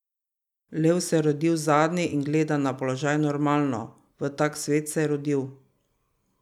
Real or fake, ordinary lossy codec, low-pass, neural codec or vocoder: real; none; 19.8 kHz; none